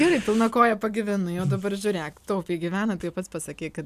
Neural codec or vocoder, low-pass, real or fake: none; 14.4 kHz; real